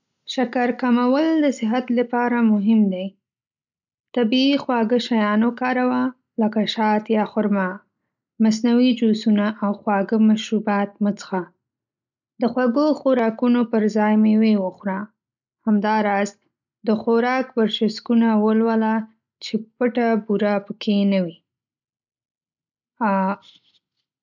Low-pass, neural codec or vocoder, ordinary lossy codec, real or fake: 7.2 kHz; none; none; real